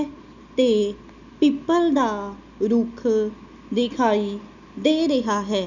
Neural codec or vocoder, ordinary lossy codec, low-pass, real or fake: none; none; 7.2 kHz; real